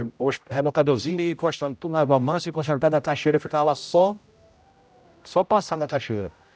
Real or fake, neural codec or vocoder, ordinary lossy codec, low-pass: fake; codec, 16 kHz, 0.5 kbps, X-Codec, HuBERT features, trained on general audio; none; none